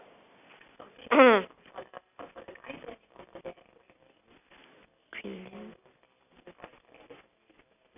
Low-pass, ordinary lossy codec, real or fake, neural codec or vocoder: 3.6 kHz; none; real; none